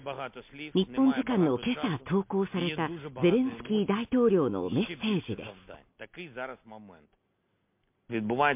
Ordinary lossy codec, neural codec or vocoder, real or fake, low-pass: MP3, 32 kbps; none; real; 3.6 kHz